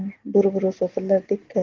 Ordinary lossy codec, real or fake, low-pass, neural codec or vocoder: Opus, 16 kbps; real; 7.2 kHz; none